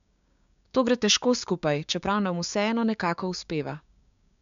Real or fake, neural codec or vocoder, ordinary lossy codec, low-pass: fake; codec, 16 kHz, 6 kbps, DAC; MP3, 64 kbps; 7.2 kHz